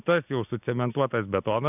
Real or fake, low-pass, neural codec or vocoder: real; 3.6 kHz; none